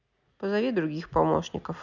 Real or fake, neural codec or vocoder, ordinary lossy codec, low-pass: real; none; none; 7.2 kHz